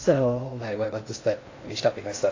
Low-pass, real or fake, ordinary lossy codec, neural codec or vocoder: 7.2 kHz; fake; AAC, 32 kbps; codec, 16 kHz in and 24 kHz out, 0.6 kbps, FocalCodec, streaming, 2048 codes